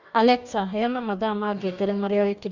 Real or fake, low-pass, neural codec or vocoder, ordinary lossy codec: fake; 7.2 kHz; codec, 44.1 kHz, 2.6 kbps, DAC; none